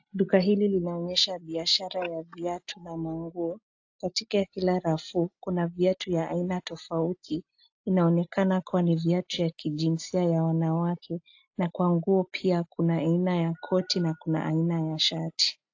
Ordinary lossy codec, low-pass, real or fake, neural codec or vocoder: AAC, 48 kbps; 7.2 kHz; real; none